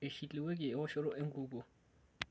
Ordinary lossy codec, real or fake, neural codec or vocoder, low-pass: none; real; none; none